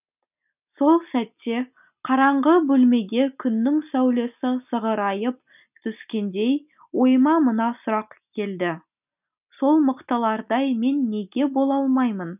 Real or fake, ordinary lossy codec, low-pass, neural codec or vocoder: real; none; 3.6 kHz; none